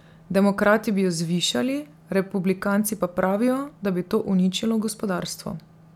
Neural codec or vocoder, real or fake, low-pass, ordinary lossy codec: none; real; 19.8 kHz; none